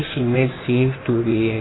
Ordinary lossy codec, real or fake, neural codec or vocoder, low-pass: AAC, 16 kbps; fake; codec, 16 kHz in and 24 kHz out, 1.1 kbps, FireRedTTS-2 codec; 7.2 kHz